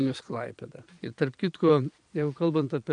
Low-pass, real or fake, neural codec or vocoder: 9.9 kHz; fake; vocoder, 22.05 kHz, 80 mel bands, Vocos